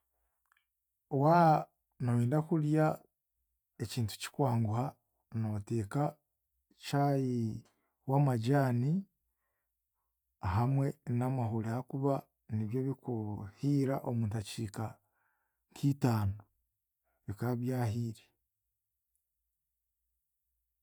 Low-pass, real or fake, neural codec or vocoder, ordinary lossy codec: none; real; none; none